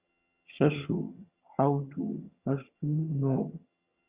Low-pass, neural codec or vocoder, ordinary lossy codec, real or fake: 3.6 kHz; vocoder, 22.05 kHz, 80 mel bands, HiFi-GAN; Opus, 64 kbps; fake